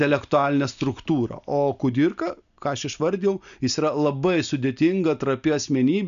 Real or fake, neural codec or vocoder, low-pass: real; none; 7.2 kHz